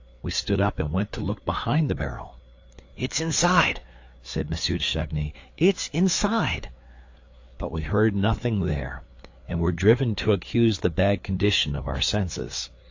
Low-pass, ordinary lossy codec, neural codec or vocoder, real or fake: 7.2 kHz; AAC, 48 kbps; codec, 16 kHz, 4 kbps, FreqCodec, larger model; fake